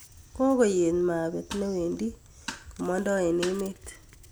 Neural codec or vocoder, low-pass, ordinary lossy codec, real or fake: none; none; none; real